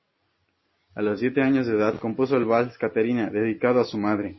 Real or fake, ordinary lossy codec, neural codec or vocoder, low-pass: real; MP3, 24 kbps; none; 7.2 kHz